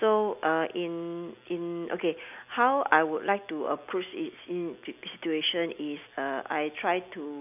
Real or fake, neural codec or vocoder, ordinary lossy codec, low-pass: real; none; none; 3.6 kHz